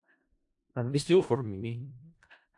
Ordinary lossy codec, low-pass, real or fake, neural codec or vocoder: AAC, 64 kbps; 10.8 kHz; fake; codec, 16 kHz in and 24 kHz out, 0.4 kbps, LongCat-Audio-Codec, four codebook decoder